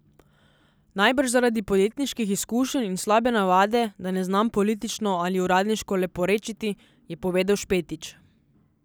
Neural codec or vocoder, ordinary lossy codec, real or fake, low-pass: none; none; real; none